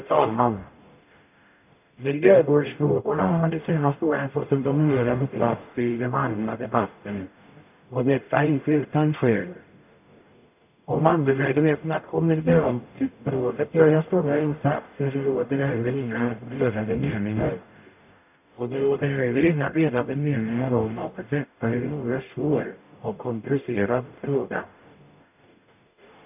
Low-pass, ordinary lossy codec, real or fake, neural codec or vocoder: 3.6 kHz; none; fake; codec, 44.1 kHz, 0.9 kbps, DAC